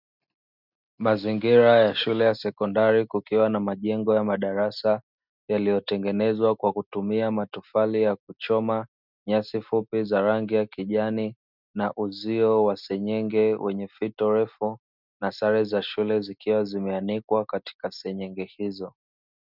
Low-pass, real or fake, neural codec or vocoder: 5.4 kHz; real; none